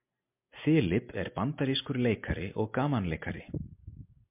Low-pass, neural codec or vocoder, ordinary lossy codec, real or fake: 3.6 kHz; none; MP3, 32 kbps; real